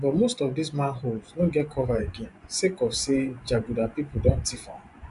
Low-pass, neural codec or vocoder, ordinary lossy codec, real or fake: 10.8 kHz; vocoder, 24 kHz, 100 mel bands, Vocos; none; fake